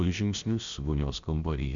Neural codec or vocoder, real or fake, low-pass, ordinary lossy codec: codec, 16 kHz, about 1 kbps, DyCAST, with the encoder's durations; fake; 7.2 kHz; Opus, 64 kbps